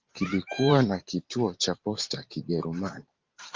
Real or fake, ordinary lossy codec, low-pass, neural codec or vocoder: fake; Opus, 32 kbps; 7.2 kHz; vocoder, 44.1 kHz, 128 mel bands every 512 samples, BigVGAN v2